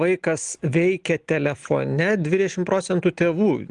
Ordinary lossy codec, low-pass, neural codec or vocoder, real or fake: Opus, 24 kbps; 10.8 kHz; none; real